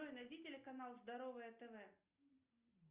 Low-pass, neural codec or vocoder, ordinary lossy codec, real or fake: 3.6 kHz; none; Opus, 32 kbps; real